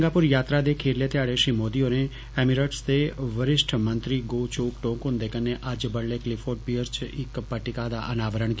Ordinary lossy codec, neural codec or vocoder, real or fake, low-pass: none; none; real; none